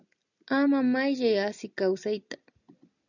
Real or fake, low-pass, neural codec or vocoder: real; 7.2 kHz; none